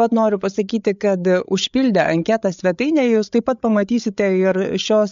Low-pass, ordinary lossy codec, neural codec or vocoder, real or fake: 7.2 kHz; MP3, 64 kbps; codec, 16 kHz, 8 kbps, FreqCodec, larger model; fake